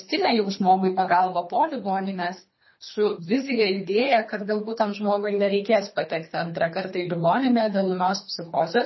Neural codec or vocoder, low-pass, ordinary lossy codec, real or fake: codec, 24 kHz, 3 kbps, HILCodec; 7.2 kHz; MP3, 24 kbps; fake